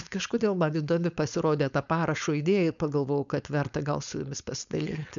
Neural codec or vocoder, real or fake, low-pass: codec, 16 kHz, 4.8 kbps, FACodec; fake; 7.2 kHz